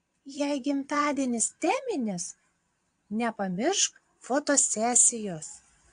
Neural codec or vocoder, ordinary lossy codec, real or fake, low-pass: vocoder, 22.05 kHz, 80 mel bands, Vocos; AAC, 64 kbps; fake; 9.9 kHz